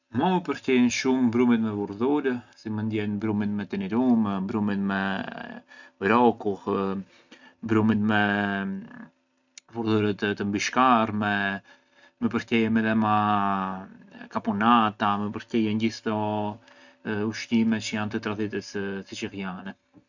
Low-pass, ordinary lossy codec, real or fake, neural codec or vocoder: 7.2 kHz; none; real; none